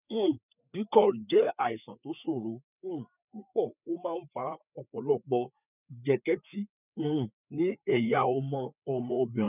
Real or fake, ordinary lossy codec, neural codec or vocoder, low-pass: fake; none; codec, 16 kHz, 4 kbps, FreqCodec, larger model; 3.6 kHz